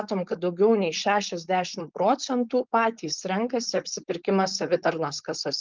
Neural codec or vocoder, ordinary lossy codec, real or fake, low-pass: codec, 16 kHz, 4.8 kbps, FACodec; Opus, 32 kbps; fake; 7.2 kHz